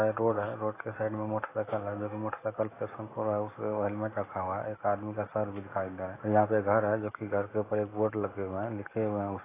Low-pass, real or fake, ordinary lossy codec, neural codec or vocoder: 3.6 kHz; real; AAC, 16 kbps; none